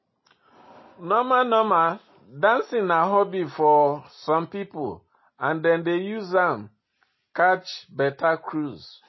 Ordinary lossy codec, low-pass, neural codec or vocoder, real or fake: MP3, 24 kbps; 7.2 kHz; none; real